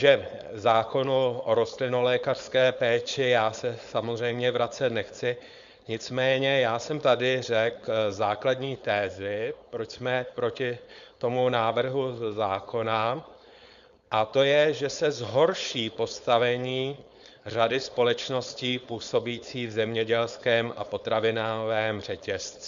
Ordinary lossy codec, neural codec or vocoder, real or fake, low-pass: Opus, 64 kbps; codec, 16 kHz, 4.8 kbps, FACodec; fake; 7.2 kHz